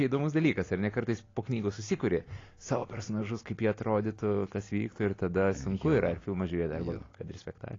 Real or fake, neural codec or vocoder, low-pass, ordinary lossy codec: real; none; 7.2 kHz; AAC, 32 kbps